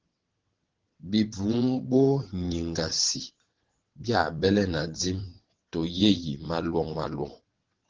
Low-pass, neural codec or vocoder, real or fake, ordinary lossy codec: 7.2 kHz; vocoder, 22.05 kHz, 80 mel bands, WaveNeXt; fake; Opus, 16 kbps